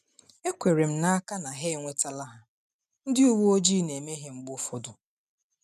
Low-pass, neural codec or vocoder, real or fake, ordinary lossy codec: 14.4 kHz; none; real; none